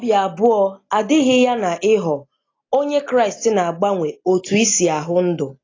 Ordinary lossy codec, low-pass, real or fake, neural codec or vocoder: AAC, 32 kbps; 7.2 kHz; real; none